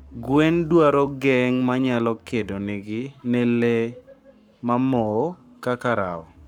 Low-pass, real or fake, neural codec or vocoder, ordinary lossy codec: 19.8 kHz; fake; codec, 44.1 kHz, 7.8 kbps, Pupu-Codec; none